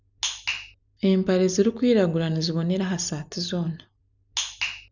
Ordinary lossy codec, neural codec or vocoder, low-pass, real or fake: none; none; 7.2 kHz; real